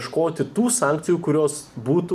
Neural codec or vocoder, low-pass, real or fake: none; 14.4 kHz; real